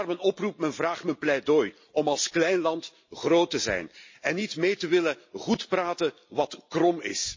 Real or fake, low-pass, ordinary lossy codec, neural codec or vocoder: real; 7.2 kHz; MP3, 32 kbps; none